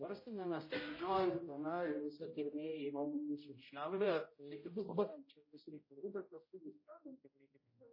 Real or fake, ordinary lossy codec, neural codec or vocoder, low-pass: fake; MP3, 24 kbps; codec, 16 kHz, 0.5 kbps, X-Codec, HuBERT features, trained on general audio; 5.4 kHz